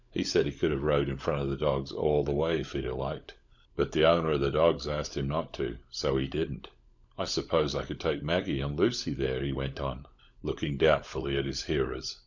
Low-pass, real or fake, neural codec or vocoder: 7.2 kHz; fake; codec, 16 kHz, 16 kbps, FunCodec, trained on LibriTTS, 50 frames a second